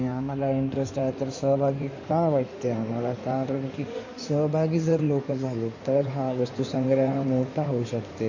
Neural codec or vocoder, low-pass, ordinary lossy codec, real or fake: codec, 16 kHz in and 24 kHz out, 2.2 kbps, FireRedTTS-2 codec; 7.2 kHz; MP3, 48 kbps; fake